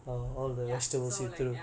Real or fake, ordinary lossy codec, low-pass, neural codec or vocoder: real; none; none; none